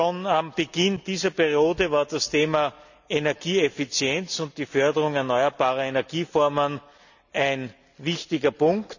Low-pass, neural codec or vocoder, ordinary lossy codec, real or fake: 7.2 kHz; none; none; real